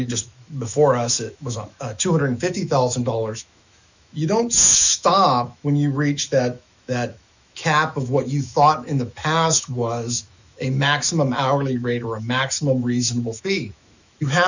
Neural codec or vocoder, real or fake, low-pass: autoencoder, 48 kHz, 128 numbers a frame, DAC-VAE, trained on Japanese speech; fake; 7.2 kHz